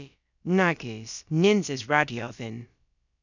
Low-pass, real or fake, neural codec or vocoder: 7.2 kHz; fake; codec, 16 kHz, about 1 kbps, DyCAST, with the encoder's durations